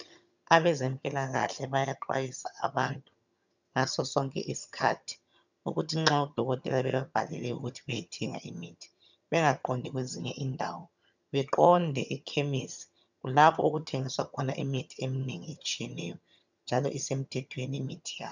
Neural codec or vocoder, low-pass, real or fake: vocoder, 22.05 kHz, 80 mel bands, HiFi-GAN; 7.2 kHz; fake